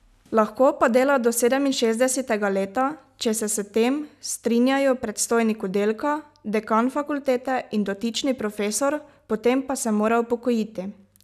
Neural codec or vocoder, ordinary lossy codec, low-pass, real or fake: none; none; 14.4 kHz; real